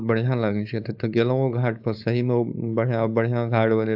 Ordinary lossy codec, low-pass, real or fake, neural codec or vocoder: AAC, 48 kbps; 5.4 kHz; fake; codec, 16 kHz, 4.8 kbps, FACodec